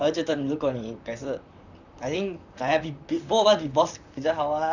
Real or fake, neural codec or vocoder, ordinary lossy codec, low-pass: fake; vocoder, 44.1 kHz, 128 mel bands, Pupu-Vocoder; none; 7.2 kHz